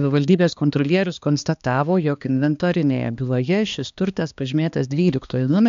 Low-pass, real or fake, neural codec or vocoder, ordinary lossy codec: 7.2 kHz; fake; codec, 16 kHz, 2 kbps, X-Codec, HuBERT features, trained on balanced general audio; MP3, 64 kbps